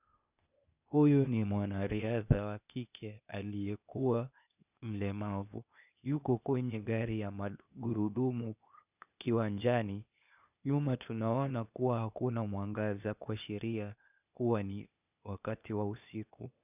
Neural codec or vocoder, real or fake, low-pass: codec, 16 kHz, 0.8 kbps, ZipCodec; fake; 3.6 kHz